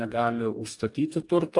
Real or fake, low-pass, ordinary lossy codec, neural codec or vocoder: fake; 10.8 kHz; AAC, 64 kbps; codec, 44.1 kHz, 2.6 kbps, DAC